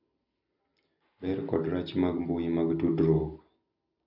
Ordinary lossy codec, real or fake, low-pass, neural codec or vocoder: none; real; 5.4 kHz; none